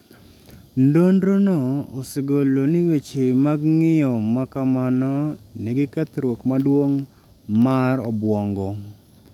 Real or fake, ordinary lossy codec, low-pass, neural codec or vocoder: fake; none; 19.8 kHz; codec, 44.1 kHz, 7.8 kbps, DAC